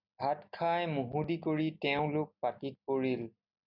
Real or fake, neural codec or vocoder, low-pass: real; none; 5.4 kHz